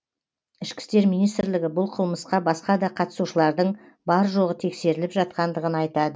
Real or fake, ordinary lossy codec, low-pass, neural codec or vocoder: real; none; none; none